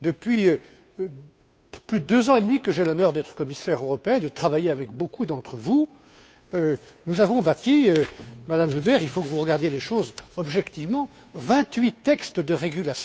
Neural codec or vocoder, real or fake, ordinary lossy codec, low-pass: codec, 16 kHz, 2 kbps, FunCodec, trained on Chinese and English, 25 frames a second; fake; none; none